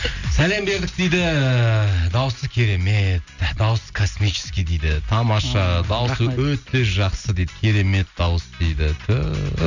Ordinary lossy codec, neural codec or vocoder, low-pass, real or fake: none; none; 7.2 kHz; real